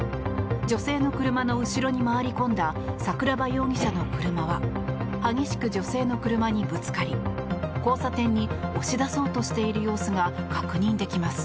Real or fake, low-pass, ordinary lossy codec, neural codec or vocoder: real; none; none; none